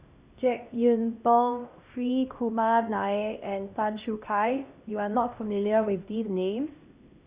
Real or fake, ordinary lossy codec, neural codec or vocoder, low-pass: fake; Opus, 64 kbps; codec, 16 kHz, 1 kbps, X-Codec, HuBERT features, trained on LibriSpeech; 3.6 kHz